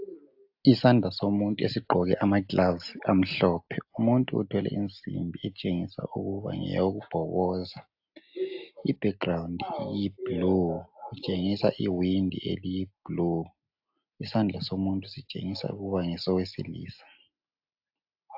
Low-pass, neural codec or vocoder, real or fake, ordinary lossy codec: 5.4 kHz; none; real; AAC, 48 kbps